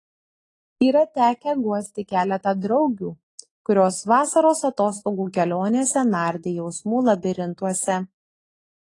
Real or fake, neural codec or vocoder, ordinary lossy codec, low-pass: real; none; AAC, 32 kbps; 10.8 kHz